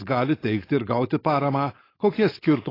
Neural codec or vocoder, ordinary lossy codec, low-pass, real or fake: codec, 16 kHz, 4.8 kbps, FACodec; AAC, 24 kbps; 5.4 kHz; fake